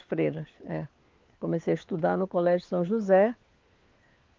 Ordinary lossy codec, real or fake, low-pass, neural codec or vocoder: Opus, 32 kbps; fake; 7.2 kHz; codec, 16 kHz, 4 kbps, FunCodec, trained on LibriTTS, 50 frames a second